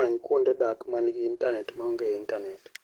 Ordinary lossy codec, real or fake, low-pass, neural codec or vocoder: Opus, 16 kbps; fake; 19.8 kHz; codec, 44.1 kHz, 7.8 kbps, DAC